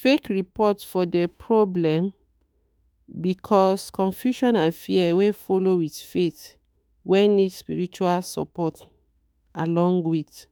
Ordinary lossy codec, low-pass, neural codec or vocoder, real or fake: none; none; autoencoder, 48 kHz, 32 numbers a frame, DAC-VAE, trained on Japanese speech; fake